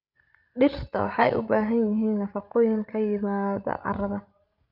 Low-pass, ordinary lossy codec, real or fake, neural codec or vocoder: 5.4 kHz; none; fake; codec, 16 kHz, 16 kbps, FreqCodec, larger model